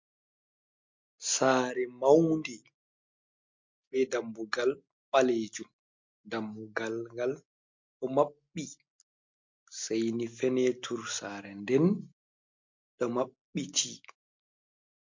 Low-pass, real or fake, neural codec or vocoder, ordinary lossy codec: 7.2 kHz; real; none; MP3, 48 kbps